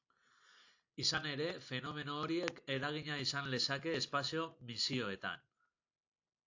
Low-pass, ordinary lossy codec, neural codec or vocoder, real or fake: 7.2 kHz; AAC, 48 kbps; none; real